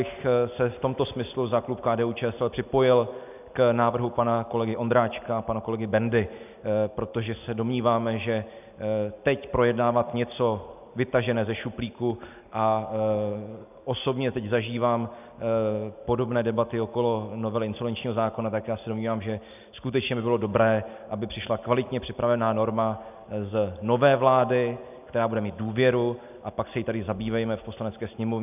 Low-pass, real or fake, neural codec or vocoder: 3.6 kHz; real; none